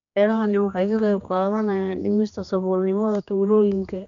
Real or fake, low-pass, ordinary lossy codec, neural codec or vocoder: fake; 7.2 kHz; none; codec, 16 kHz, 2 kbps, X-Codec, HuBERT features, trained on general audio